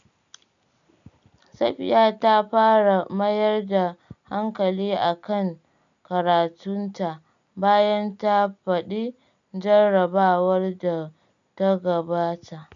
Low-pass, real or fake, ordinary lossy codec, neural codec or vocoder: 7.2 kHz; real; none; none